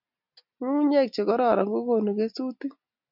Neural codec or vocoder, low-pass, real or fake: none; 5.4 kHz; real